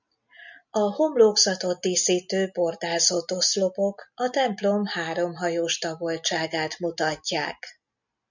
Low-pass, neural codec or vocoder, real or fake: 7.2 kHz; none; real